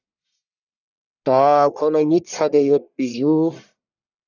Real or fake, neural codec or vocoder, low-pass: fake; codec, 44.1 kHz, 1.7 kbps, Pupu-Codec; 7.2 kHz